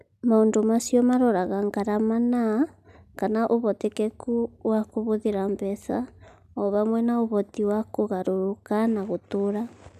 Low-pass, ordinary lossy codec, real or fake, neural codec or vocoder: 14.4 kHz; none; real; none